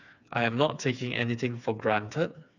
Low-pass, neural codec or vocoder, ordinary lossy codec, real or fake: 7.2 kHz; codec, 16 kHz, 4 kbps, FreqCodec, smaller model; none; fake